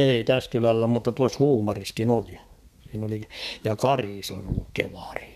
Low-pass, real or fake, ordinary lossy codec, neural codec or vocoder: 14.4 kHz; fake; none; codec, 32 kHz, 1.9 kbps, SNAC